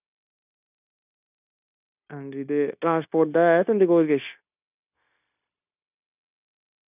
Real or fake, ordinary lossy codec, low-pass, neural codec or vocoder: fake; none; 3.6 kHz; codec, 16 kHz, 0.9 kbps, LongCat-Audio-Codec